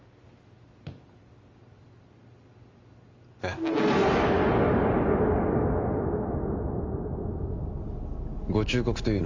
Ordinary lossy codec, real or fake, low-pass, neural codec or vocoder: Opus, 32 kbps; real; 7.2 kHz; none